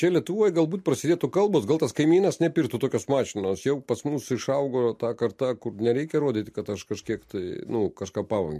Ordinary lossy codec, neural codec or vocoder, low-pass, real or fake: MP3, 64 kbps; none; 14.4 kHz; real